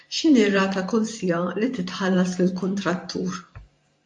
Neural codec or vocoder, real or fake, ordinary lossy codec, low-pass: vocoder, 44.1 kHz, 128 mel bands every 512 samples, BigVGAN v2; fake; MP3, 48 kbps; 10.8 kHz